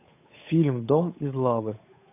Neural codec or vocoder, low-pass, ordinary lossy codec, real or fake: codec, 16 kHz, 8 kbps, FunCodec, trained on Chinese and English, 25 frames a second; 3.6 kHz; AAC, 24 kbps; fake